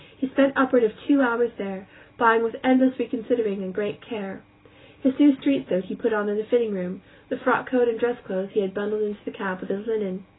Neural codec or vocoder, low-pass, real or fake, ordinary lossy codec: none; 7.2 kHz; real; AAC, 16 kbps